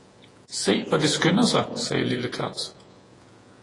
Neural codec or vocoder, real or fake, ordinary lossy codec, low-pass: vocoder, 48 kHz, 128 mel bands, Vocos; fake; AAC, 32 kbps; 10.8 kHz